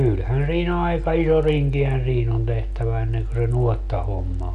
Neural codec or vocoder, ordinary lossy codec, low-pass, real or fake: none; none; 10.8 kHz; real